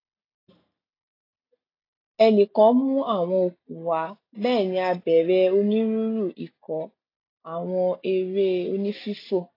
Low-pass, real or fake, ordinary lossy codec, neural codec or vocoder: 5.4 kHz; real; AAC, 32 kbps; none